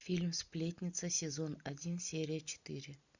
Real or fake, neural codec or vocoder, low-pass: real; none; 7.2 kHz